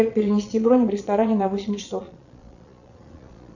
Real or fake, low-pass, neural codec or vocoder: fake; 7.2 kHz; vocoder, 22.05 kHz, 80 mel bands, Vocos